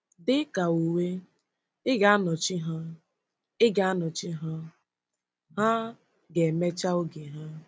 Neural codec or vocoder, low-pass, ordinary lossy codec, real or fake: none; none; none; real